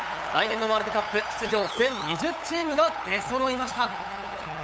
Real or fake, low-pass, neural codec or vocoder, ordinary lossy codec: fake; none; codec, 16 kHz, 8 kbps, FunCodec, trained on LibriTTS, 25 frames a second; none